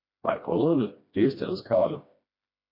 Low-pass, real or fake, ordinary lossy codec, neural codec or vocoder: 5.4 kHz; fake; MP3, 32 kbps; codec, 16 kHz, 1 kbps, FreqCodec, smaller model